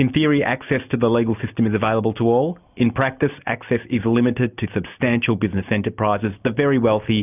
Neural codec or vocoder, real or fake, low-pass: none; real; 3.6 kHz